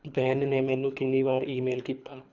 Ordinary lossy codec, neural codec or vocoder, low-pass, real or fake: none; codec, 24 kHz, 3 kbps, HILCodec; 7.2 kHz; fake